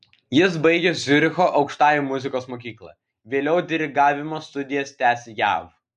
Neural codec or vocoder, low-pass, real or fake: none; 10.8 kHz; real